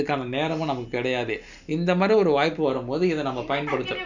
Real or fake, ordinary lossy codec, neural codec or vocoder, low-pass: real; none; none; 7.2 kHz